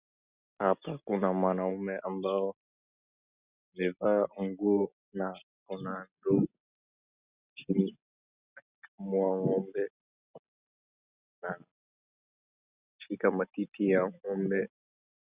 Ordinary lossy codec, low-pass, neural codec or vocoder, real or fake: Opus, 64 kbps; 3.6 kHz; none; real